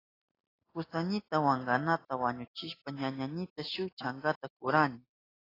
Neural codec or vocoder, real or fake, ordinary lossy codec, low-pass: none; real; AAC, 24 kbps; 5.4 kHz